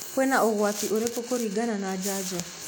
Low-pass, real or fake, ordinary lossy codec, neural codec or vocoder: none; real; none; none